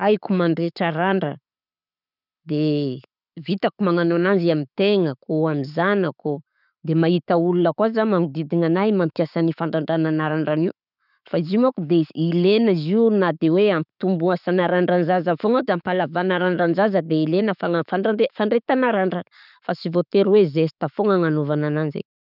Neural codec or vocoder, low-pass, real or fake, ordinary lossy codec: none; 5.4 kHz; real; none